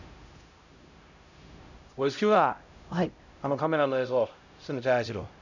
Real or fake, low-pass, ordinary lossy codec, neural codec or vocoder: fake; 7.2 kHz; none; codec, 16 kHz, 0.5 kbps, X-Codec, HuBERT features, trained on LibriSpeech